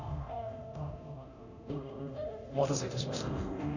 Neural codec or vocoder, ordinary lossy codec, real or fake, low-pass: codec, 24 kHz, 0.9 kbps, DualCodec; none; fake; 7.2 kHz